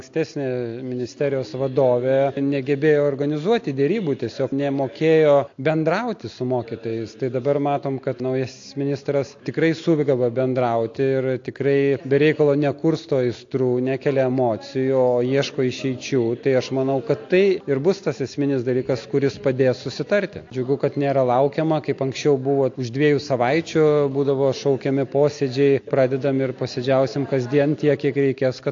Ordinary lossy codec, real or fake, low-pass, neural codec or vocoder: AAC, 48 kbps; real; 7.2 kHz; none